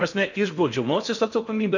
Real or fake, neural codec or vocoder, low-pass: fake; codec, 16 kHz in and 24 kHz out, 0.6 kbps, FocalCodec, streaming, 2048 codes; 7.2 kHz